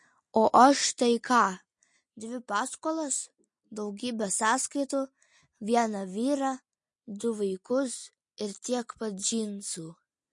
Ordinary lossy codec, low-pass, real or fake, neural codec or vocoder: MP3, 48 kbps; 10.8 kHz; real; none